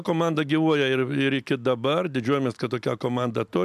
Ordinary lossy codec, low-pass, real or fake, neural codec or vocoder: MP3, 96 kbps; 14.4 kHz; real; none